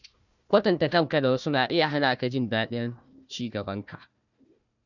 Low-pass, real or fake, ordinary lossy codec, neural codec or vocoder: 7.2 kHz; fake; none; codec, 16 kHz, 1 kbps, FunCodec, trained on Chinese and English, 50 frames a second